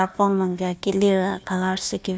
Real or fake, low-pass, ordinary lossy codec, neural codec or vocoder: fake; none; none; codec, 16 kHz, 1 kbps, FunCodec, trained on Chinese and English, 50 frames a second